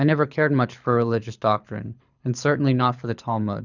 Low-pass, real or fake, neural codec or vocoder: 7.2 kHz; fake; codec, 24 kHz, 6 kbps, HILCodec